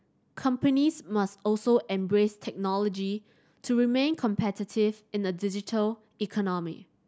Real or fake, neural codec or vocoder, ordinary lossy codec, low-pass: real; none; none; none